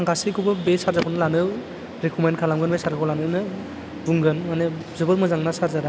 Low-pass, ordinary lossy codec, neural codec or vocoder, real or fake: none; none; none; real